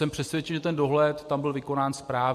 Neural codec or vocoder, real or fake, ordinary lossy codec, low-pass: none; real; MP3, 64 kbps; 14.4 kHz